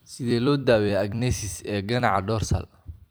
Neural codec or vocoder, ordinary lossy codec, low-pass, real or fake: vocoder, 44.1 kHz, 128 mel bands every 256 samples, BigVGAN v2; none; none; fake